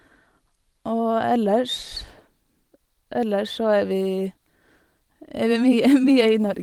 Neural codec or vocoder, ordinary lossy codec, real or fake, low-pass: vocoder, 44.1 kHz, 128 mel bands every 512 samples, BigVGAN v2; Opus, 24 kbps; fake; 19.8 kHz